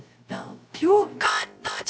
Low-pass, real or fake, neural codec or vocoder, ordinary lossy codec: none; fake; codec, 16 kHz, 0.3 kbps, FocalCodec; none